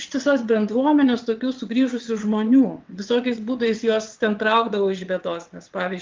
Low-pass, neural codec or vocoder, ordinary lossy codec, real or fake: 7.2 kHz; vocoder, 22.05 kHz, 80 mel bands, WaveNeXt; Opus, 32 kbps; fake